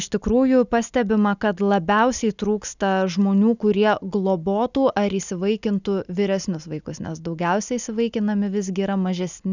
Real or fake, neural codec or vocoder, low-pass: real; none; 7.2 kHz